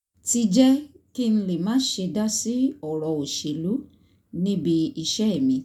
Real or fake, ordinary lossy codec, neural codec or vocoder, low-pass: real; none; none; 19.8 kHz